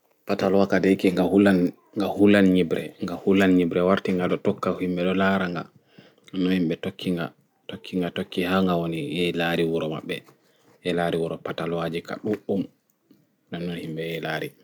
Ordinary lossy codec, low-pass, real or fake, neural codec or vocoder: none; 19.8 kHz; real; none